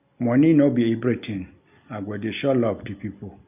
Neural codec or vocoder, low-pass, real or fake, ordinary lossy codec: none; 3.6 kHz; real; none